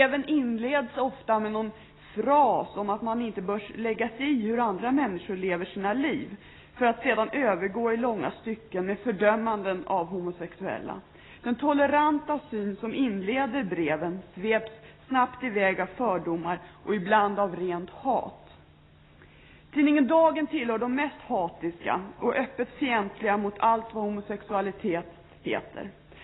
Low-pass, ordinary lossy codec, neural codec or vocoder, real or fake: 7.2 kHz; AAC, 16 kbps; none; real